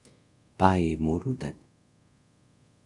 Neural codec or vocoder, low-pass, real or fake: codec, 24 kHz, 0.5 kbps, DualCodec; 10.8 kHz; fake